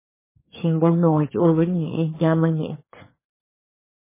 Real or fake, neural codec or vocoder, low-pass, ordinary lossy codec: fake; codec, 24 kHz, 1 kbps, SNAC; 3.6 kHz; MP3, 16 kbps